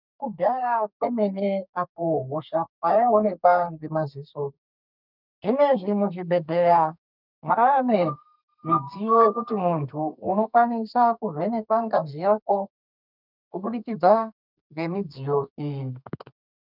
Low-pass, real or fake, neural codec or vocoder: 5.4 kHz; fake; codec, 32 kHz, 1.9 kbps, SNAC